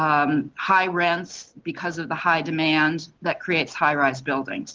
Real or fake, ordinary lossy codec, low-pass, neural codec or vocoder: real; Opus, 16 kbps; 7.2 kHz; none